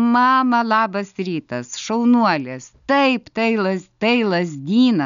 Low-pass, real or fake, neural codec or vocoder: 7.2 kHz; real; none